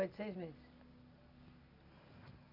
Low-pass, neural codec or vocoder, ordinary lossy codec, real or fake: 5.4 kHz; none; none; real